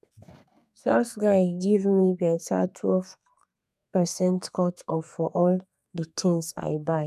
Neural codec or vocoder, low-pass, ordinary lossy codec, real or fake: codec, 32 kHz, 1.9 kbps, SNAC; 14.4 kHz; none; fake